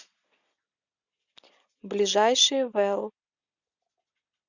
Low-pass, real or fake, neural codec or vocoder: 7.2 kHz; real; none